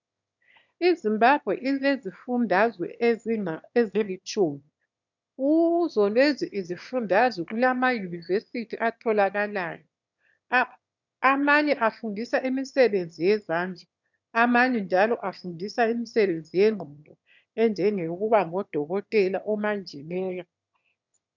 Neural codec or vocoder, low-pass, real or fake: autoencoder, 22.05 kHz, a latent of 192 numbers a frame, VITS, trained on one speaker; 7.2 kHz; fake